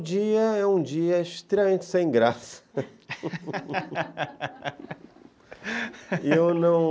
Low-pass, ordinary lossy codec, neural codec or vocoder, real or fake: none; none; none; real